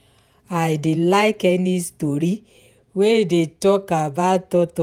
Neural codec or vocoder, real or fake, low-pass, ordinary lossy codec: vocoder, 48 kHz, 128 mel bands, Vocos; fake; 19.8 kHz; none